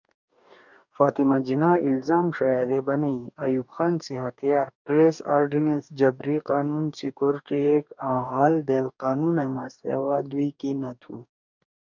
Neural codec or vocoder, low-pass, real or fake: codec, 44.1 kHz, 2.6 kbps, DAC; 7.2 kHz; fake